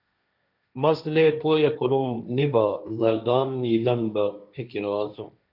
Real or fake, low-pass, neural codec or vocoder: fake; 5.4 kHz; codec, 16 kHz, 1.1 kbps, Voila-Tokenizer